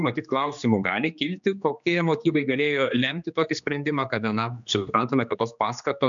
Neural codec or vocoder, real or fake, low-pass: codec, 16 kHz, 2 kbps, X-Codec, HuBERT features, trained on general audio; fake; 7.2 kHz